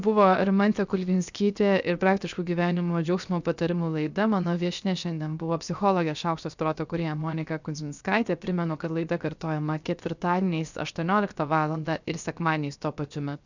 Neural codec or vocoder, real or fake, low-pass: codec, 16 kHz, 0.7 kbps, FocalCodec; fake; 7.2 kHz